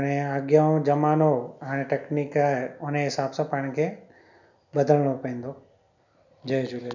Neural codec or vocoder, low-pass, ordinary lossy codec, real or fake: none; 7.2 kHz; none; real